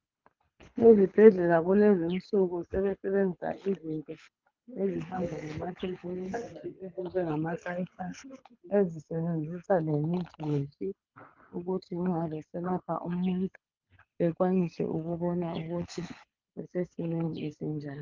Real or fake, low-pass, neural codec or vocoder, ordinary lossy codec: fake; 7.2 kHz; codec, 24 kHz, 6 kbps, HILCodec; Opus, 24 kbps